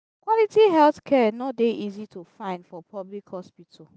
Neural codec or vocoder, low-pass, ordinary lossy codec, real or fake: none; none; none; real